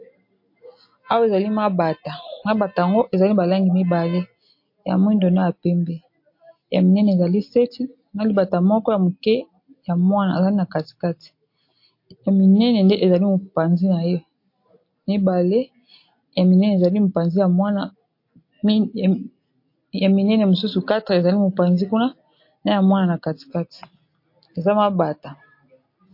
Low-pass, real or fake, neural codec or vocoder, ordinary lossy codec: 5.4 kHz; real; none; MP3, 32 kbps